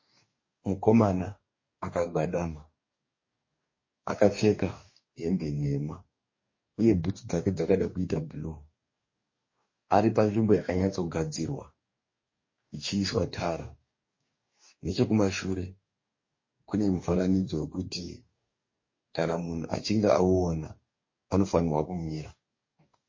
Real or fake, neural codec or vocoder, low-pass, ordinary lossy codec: fake; codec, 44.1 kHz, 2.6 kbps, DAC; 7.2 kHz; MP3, 32 kbps